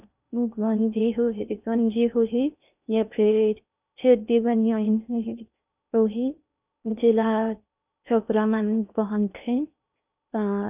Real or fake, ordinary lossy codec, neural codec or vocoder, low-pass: fake; none; codec, 16 kHz in and 24 kHz out, 0.6 kbps, FocalCodec, streaming, 2048 codes; 3.6 kHz